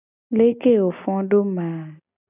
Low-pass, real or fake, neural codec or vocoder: 3.6 kHz; real; none